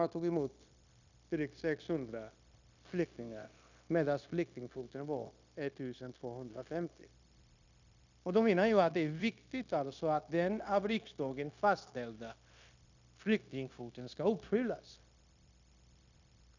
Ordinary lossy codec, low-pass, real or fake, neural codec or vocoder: none; 7.2 kHz; fake; codec, 16 kHz, 0.9 kbps, LongCat-Audio-Codec